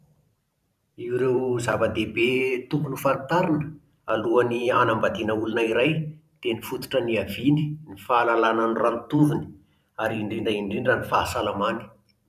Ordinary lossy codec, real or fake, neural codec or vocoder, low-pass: none; fake; vocoder, 44.1 kHz, 128 mel bands every 512 samples, BigVGAN v2; 14.4 kHz